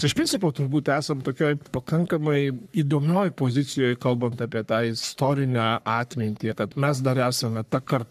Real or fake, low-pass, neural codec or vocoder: fake; 14.4 kHz; codec, 44.1 kHz, 3.4 kbps, Pupu-Codec